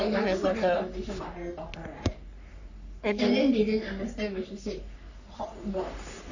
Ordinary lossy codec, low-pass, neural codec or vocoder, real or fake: none; 7.2 kHz; codec, 44.1 kHz, 3.4 kbps, Pupu-Codec; fake